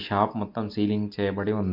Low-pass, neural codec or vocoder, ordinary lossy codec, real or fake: 5.4 kHz; none; MP3, 48 kbps; real